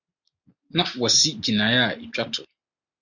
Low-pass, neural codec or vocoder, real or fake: 7.2 kHz; none; real